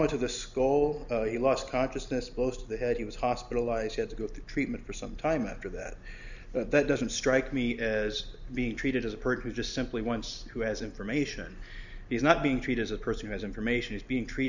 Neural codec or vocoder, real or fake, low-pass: none; real; 7.2 kHz